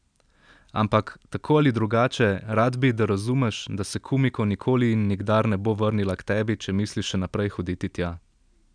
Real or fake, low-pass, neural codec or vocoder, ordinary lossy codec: real; 9.9 kHz; none; none